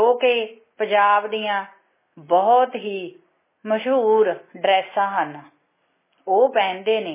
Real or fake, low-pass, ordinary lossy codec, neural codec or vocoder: real; 3.6 kHz; MP3, 16 kbps; none